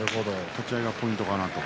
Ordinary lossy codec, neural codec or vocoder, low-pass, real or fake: none; none; none; real